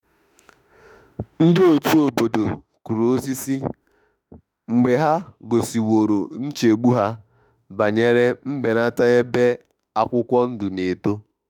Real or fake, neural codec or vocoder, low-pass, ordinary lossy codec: fake; autoencoder, 48 kHz, 32 numbers a frame, DAC-VAE, trained on Japanese speech; 19.8 kHz; none